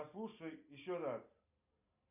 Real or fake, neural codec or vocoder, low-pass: real; none; 3.6 kHz